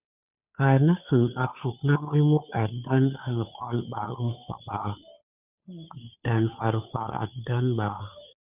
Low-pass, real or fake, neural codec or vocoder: 3.6 kHz; fake; codec, 16 kHz, 2 kbps, FunCodec, trained on Chinese and English, 25 frames a second